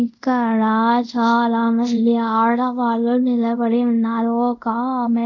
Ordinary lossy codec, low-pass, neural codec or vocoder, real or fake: none; 7.2 kHz; codec, 24 kHz, 0.5 kbps, DualCodec; fake